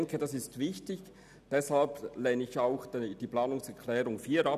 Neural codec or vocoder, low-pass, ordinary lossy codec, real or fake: none; 14.4 kHz; none; real